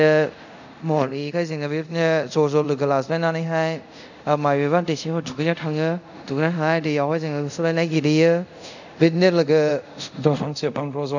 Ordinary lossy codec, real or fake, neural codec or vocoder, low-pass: none; fake; codec, 24 kHz, 0.5 kbps, DualCodec; 7.2 kHz